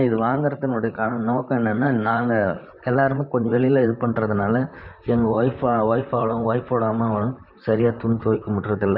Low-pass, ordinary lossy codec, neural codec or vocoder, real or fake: 5.4 kHz; none; vocoder, 44.1 kHz, 128 mel bands, Pupu-Vocoder; fake